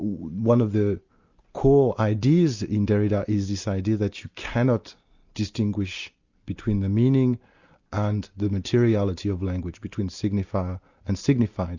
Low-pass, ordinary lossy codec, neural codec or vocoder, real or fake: 7.2 kHz; Opus, 64 kbps; none; real